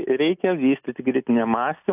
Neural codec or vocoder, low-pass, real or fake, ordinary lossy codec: none; 3.6 kHz; real; AAC, 32 kbps